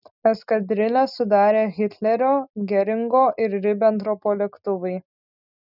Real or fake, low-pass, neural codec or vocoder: real; 5.4 kHz; none